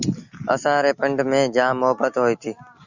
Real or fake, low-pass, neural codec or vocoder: real; 7.2 kHz; none